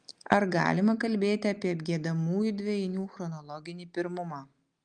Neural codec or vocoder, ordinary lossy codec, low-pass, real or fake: none; Opus, 32 kbps; 9.9 kHz; real